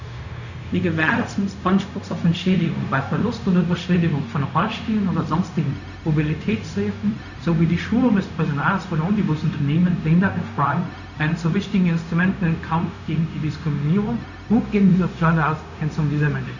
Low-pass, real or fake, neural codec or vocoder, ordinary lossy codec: 7.2 kHz; fake; codec, 16 kHz, 0.4 kbps, LongCat-Audio-Codec; none